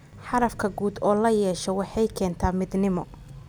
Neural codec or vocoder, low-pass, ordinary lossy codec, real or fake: none; none; none; real